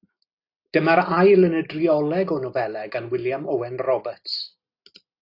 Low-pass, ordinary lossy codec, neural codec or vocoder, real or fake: 5.4 kHz; AAC, 32 kbps; none; real